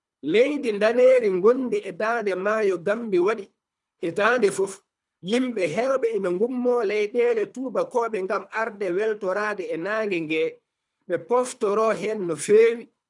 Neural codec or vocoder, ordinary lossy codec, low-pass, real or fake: codec, 24 kHz, 3 kbps, HILCodec; none; 10.8 kHz; fake